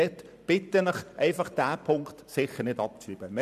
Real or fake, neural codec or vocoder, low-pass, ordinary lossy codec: fake; vocoder, 44.1 kHz, 128 mel bands every 512 samples, BigVGAN v2; 14.4 kHz; none